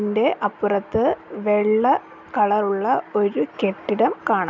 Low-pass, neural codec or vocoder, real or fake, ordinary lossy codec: 7.2 kHz; none; real; none